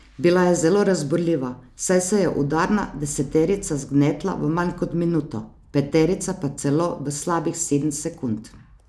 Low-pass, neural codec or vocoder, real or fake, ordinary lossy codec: none; none; real; none